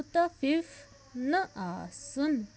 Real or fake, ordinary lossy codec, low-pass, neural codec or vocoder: real; none; none; none